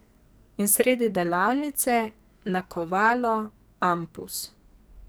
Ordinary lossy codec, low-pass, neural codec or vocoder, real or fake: none; none; codec, 44.1 kHz, 2.6 kbps, SNAC; fake